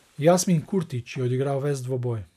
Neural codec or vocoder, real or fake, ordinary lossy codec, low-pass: none; real; none; 14.4 kHz